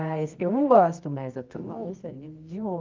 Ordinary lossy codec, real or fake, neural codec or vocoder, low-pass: Opus, 32 kbps; fake; codec, 24 kHz, 0.9 kbps, WavTokenizer, medium music audio release; 7.2 kHz